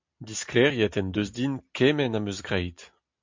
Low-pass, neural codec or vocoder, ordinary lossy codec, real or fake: 7.2 kHz; none; MP3, 48 kbps; real